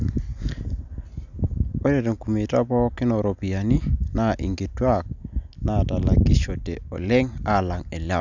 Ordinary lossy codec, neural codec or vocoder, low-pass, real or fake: none; none; 7.2 kHz; real